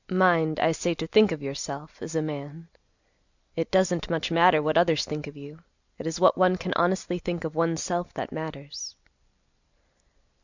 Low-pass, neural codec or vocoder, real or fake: 7.2 kHz; none; real